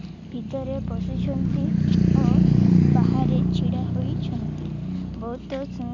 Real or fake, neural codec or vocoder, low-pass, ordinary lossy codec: real; none; 7.2 kHz; none